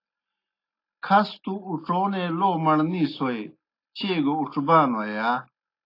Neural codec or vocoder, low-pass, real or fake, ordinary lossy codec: none; 5.4 kHz; real; AAC, 32 kbps